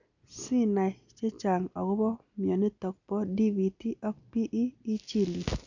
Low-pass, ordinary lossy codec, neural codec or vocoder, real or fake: 7.2 kHz; none; none; real